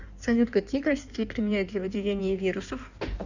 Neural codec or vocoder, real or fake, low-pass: codec, 16 kHz in and 24 kHz out, 1.1 kbps, FireRedTTS-2 codec; fake; 7.2 kHz